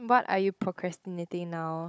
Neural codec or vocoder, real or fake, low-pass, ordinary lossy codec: codec, 16 kHz, 16 kbps, FunCodec, trained on Chinese and English, 50 frames a second; fake; none; none